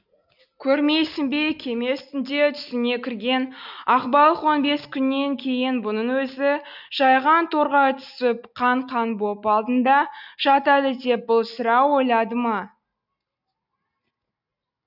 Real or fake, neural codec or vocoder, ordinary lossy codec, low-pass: real; none; none; 5.4 kHz